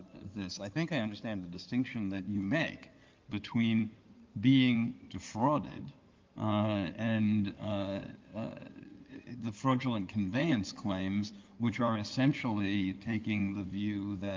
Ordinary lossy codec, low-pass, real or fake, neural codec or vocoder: Opus, 32 kbps; 7.2 kHz; fake; codec, 16 kHz in and 24 kHz out, 2.2 kbps, FireRedTTS-2 codec